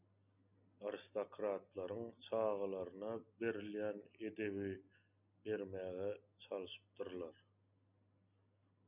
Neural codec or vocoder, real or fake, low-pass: none; real; 3.6 kHz